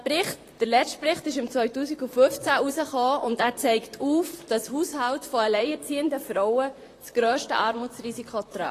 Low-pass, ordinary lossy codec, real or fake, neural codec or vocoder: 14.4 kHz; AAC, 48 kbps; fake; vocoder, 44.1 kHz, 128 mel bands, Pupu-Vocoder